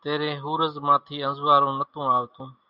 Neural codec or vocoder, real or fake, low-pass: none; real; 5.4 kHz